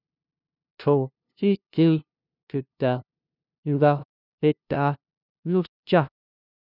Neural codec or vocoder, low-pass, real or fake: codec, 16 kHz, 0.5 kbps, FunCodec, trained on LibriTTS, 25 frames a second; 5.4 kHz; fake